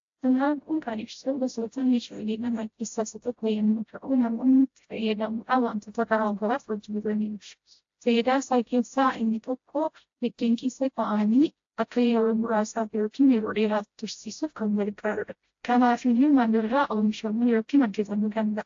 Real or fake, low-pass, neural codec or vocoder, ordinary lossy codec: fake; 7.2 kHz; codec, 16 kHz, 0.5 kbps, FreqCodec, smaller model; AAC, 48 kbps